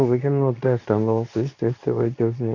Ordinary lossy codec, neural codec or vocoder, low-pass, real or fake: none; codec, 24 kHz, 0.9 kbps, WavTokenizer, medium speech release version 2; 7.2 kHz; fake